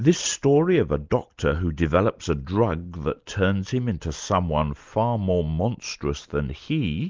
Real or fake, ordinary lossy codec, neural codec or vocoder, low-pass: real; Opus, 32 kbps; none; 7.2 kHz